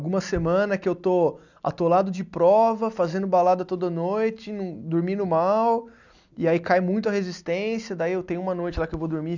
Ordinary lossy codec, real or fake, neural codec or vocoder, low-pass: none; real; none; 7.2 kHz